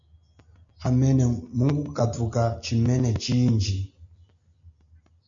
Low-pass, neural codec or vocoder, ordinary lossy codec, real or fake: 7.2 kHz; none; MP3, 64 kbps; real